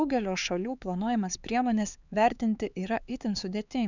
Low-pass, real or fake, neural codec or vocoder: 7.2 kHz; fake; codec, 16 kHz, 4 kbps, X-Codec, HuBERT features, trained on LibriSpeech